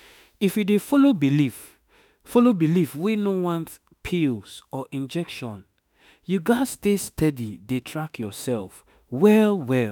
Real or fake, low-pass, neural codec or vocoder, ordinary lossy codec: fake; 19.8 kHz; autoencoder, 48 kHz, 32 numbers a frame, DAC-VAE, trained on Japanese speech; none